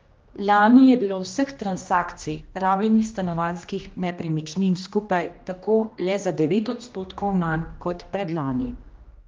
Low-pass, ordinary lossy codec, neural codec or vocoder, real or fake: 7.2 kHz; Opus, 32 kbps; codec, 16 kHz, 1 kbps, X-Codec, HuBERT features, trained on general audio; fake